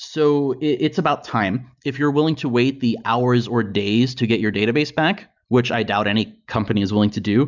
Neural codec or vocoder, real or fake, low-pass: none; real; 7.2 kHz